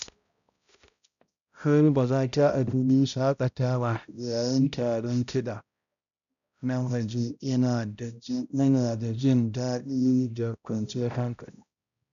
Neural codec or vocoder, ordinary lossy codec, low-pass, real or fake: codec, 16 kHz, 0.5 kbps, X-Codec, HuBERT features, trained on balanced general audio; none; 7.2 kHz; fake